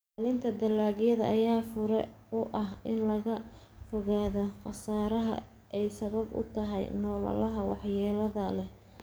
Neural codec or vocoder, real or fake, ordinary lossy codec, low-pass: codec, 44.1 kHz, 7.8 kbps, DAC; fake; none; none